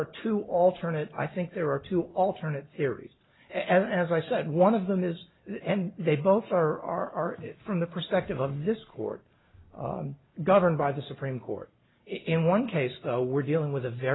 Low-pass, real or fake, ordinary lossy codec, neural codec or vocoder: 7.2 kHz; real; AAC, 16 kbps; none